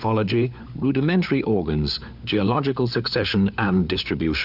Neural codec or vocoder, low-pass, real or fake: codec, 16 kHz, 4 kbps, FunCodec, trained on LibriTTS, 50 frames a second; 5.4 kHz; fake